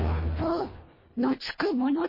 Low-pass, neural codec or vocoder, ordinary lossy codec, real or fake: 5.4 kHz; codec, 24 kHz, 1.5 kbps, HILCodec; MP3, 24 kbps; fake